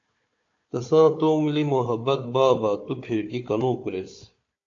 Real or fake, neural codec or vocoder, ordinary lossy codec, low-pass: fake; codec, 16 kHz, 4 kbps, FunCodec, trained on Chinese and English, 50 frames a second; AAC, 48 kbps; 7.2 kHz